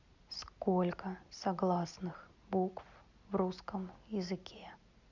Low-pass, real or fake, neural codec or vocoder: 7.2 kHz; real; none